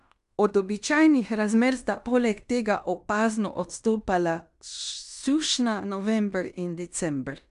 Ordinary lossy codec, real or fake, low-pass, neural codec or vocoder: none; fake; 10.8 kHz; codec, 16 kHz in and 24 kHz out, 0.9 kbps, LongCat-Audio-Codec, fine tuned four codebook decoder